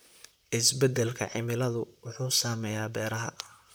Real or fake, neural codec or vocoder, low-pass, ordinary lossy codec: fake; vocoder, 44.1 kHz, 128 mel bands, Pupu-Vocoder; none; none